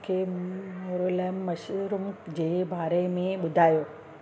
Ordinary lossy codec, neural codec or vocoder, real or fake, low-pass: none; none; real; none